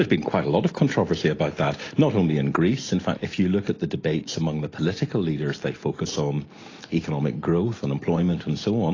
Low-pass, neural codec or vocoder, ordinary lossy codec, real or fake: 7.2 kHz; none; AAC, 32 kbps; real